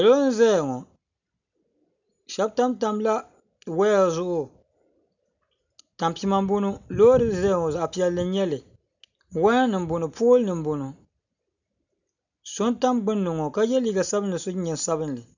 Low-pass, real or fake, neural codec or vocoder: 7.2 kHz; real; none